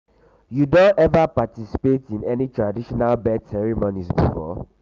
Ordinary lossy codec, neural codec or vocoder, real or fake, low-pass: Opus, 32 kbps; none; real; 7.2 kHz